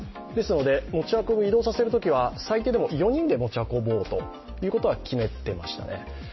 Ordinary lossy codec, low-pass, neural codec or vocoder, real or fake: MP3, 24 kbps; 7.2 kHz; none; real